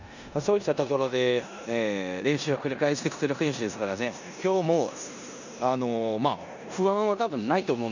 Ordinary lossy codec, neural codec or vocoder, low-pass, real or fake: none; codec, 16 kHz in and 24 kHz out, 0.9 kbps, LongCat-Audio-Codec, four codebook decoder; 7.2 kHz; fake